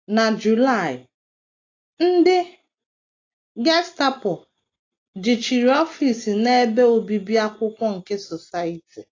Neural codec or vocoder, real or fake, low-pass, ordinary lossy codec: none; real; 7.2 kHz; AAC, 32 kbps